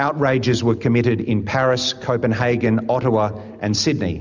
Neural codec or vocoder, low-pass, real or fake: none; 7.2 kHz; real